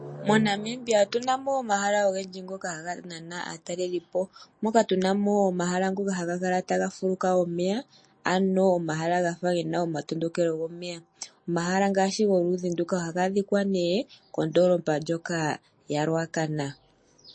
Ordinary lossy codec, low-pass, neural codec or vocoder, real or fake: MP3, 32 kbps; 9.9 kHz; none; real